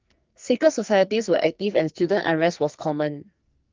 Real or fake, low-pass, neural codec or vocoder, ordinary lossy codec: fake; 7.2 kHz; codec, 44.1 kHz, 2.6 kbps, SNAC; Opus, 24 kbps